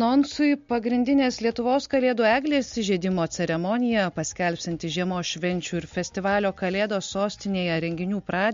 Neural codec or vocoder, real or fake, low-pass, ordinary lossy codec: none; real; 7.2 kHz; MP3, 48 kbps